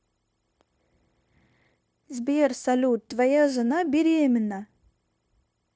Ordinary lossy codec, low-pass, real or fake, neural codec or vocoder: none; none; fake; codec, 16 kHz, 0.9 kbps, LongCat-Audio-Codec